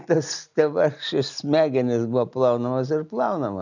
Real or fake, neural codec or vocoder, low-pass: real; none; 7.2 kHz